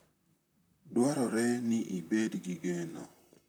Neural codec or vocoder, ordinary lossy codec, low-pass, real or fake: vocoder, 44.1 kHz, 128 mel bands, Pupu-Vocoder; none; none; fake